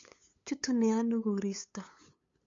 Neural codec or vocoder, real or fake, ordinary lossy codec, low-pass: codec, 16 kHz, 8 kbps, FunCodec, trained on LibriTTS, 25 frames a second; fake; MP3, 48 kbps; 7.2 kHz